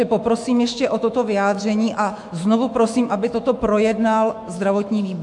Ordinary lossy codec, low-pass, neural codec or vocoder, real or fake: MP3, 64 kbps; 10.8 kHz; autoencoder, 48 kHz, 128 numbers a frame, DAC-VAE, trained on Japanese speech; fake